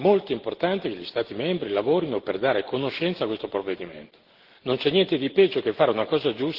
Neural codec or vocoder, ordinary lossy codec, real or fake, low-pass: none; Opus, 16 kbps; real; 5.4 kHz